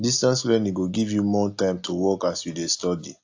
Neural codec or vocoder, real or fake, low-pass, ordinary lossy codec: none; real; 7.2 kHz; AAC, 48 kbps